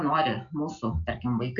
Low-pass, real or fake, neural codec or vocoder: 7.2 kHz; real; none